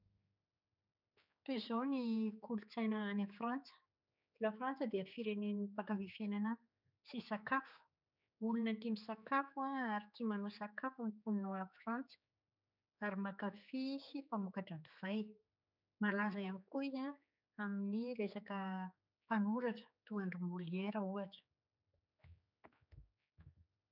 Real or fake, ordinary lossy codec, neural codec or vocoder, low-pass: fake; none; codec, 16 kHz, 4 kbps, X-Codec, HuBERT features, trained on general audio; 5.4 kHz